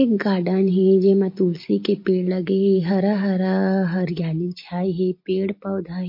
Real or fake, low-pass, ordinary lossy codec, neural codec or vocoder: real; 5.4 kHz; MP3, 32 kbps; none